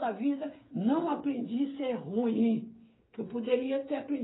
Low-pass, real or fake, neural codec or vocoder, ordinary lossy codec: 7.2 kHz; fake; codec, 16 kHz, 8 kbps, FreqCodec, smaller model; AAC, 16 kbps